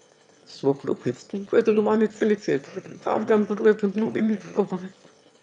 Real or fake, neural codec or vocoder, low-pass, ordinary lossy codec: fake; autoencoder, 22.05 kHz, a latent of 192 numbers a frame, VITS, trained on one speaker; 9.9 kHz; none